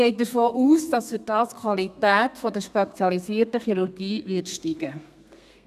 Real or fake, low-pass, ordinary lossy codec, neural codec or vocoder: fake; 14.4 kHz; none; codec, 32 kHz, 1.9 kbps, SNAC